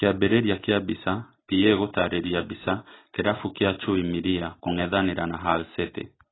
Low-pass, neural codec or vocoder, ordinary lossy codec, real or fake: 7.2 kHz; none; AAC, 16 kbps; real